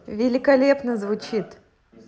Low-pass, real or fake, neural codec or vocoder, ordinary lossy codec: none; real; none; none